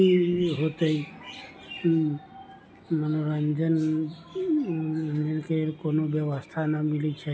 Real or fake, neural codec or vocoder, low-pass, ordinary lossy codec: real; none; none; none